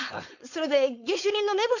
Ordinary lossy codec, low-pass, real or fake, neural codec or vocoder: none; 7.2 kHz; fake; codec, 16 kHz, 4.8 kbps, FACodec